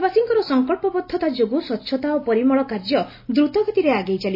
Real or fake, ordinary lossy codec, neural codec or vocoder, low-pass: real; MP3, 24 kbps; none; 5.4 kHz